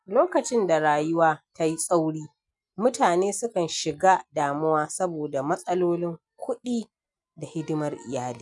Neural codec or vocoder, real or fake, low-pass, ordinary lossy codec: none; real; 10.8 kHz; none